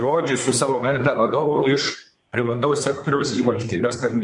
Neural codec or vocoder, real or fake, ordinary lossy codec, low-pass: codec, 24 kHz, 1 kbps, SNAC; fake; MP3, 64 kbps; 10.8 kHz